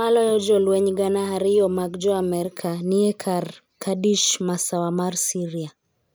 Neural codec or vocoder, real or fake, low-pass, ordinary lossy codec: none; real; none; none